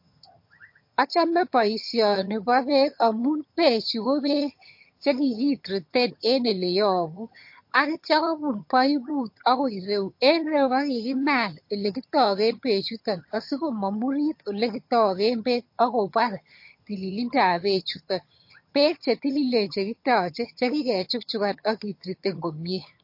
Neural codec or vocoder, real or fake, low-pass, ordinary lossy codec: vocoder, 22.05 kHz, 80 mel bands, HiFi-GAN; fake; 5.4 kHz; MP3, 32 kbps